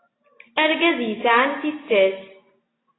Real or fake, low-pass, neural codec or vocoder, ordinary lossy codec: real; 7.2 kHz; none; AAC, 16 kbps